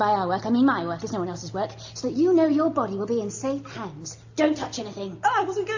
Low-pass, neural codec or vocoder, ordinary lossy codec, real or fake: 7.2 kHz; none; AAC, 48 kbps; real